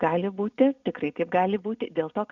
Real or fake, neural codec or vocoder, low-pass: real; none; 7.2 kHz